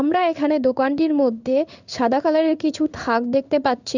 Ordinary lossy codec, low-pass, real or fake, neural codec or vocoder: none; 7.2 kHz; fake; codec, 16 kHz in and 24 kHz out, 1 kbps, XY-Tokenizer